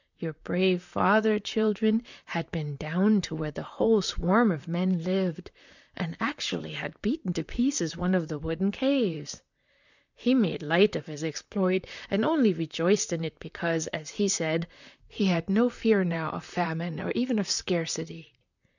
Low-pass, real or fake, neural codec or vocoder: 7.2 kHz; fake; vocoder, 44.1 kHz, 128 mel bands, Pupu-Vocoder